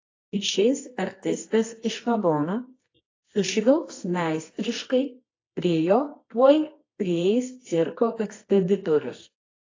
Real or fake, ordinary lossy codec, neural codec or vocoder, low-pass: fake; AAC, 32 kbps; codec, 24 kHz, 0.9 kbps, WavTokenizer, medium music audio release; 7.2 kHz